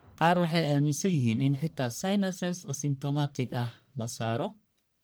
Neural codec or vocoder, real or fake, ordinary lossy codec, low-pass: codec, 44.1 kHz, 1.7 kbps, Pupu-Codec; fake; none; none